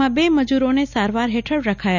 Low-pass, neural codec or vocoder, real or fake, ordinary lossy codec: 7.2 kHz; none; real; none